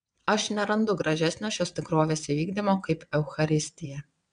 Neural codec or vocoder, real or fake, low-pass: vocoder, 22.05 kHz, 80 mel bands, WaveNeXt; fake; 9.9 kHz